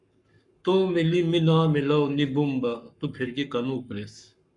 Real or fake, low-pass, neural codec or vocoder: fake; 10.8 kHz; codec, 44.1 kHz, 7.8 kbps, Pupu-Codec